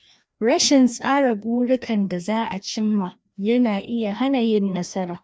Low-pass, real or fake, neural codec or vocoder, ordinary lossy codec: none; fake; codec, 16 kHz, 1 kbps, FreqCodec, larger model; none